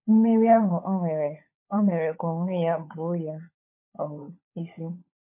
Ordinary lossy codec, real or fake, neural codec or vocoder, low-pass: MP3, 24 kbps; fake; codec, 16 kHz, 16 kbps, FunCodec, trained on LibriTTS, 50 frames a second; 3.6 kHz